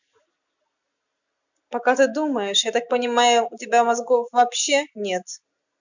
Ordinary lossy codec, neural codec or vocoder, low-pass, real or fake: none; none; 7.2 kHz; real